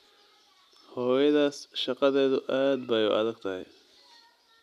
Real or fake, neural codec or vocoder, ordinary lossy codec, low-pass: real; none; none; 14.4 kHz